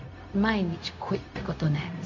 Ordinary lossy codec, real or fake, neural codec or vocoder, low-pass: none; fake; codec, 16 kHz, 0.4 kbps, LongCat-Audio-Codec; 7.2 kHz